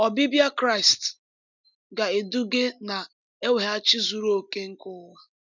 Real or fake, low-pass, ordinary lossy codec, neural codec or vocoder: real; 7.2 kHz; none; none